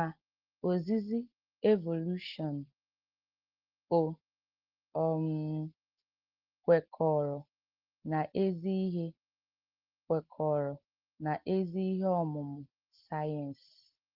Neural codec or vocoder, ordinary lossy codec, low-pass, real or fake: none; Opus, 16 kbps; 5.4 kHz; real